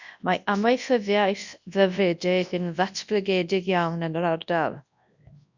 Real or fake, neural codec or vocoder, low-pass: fake; codec, 24 kHz, 0.9 kbps, WavTokenizer, large speech release; 7.2 kHz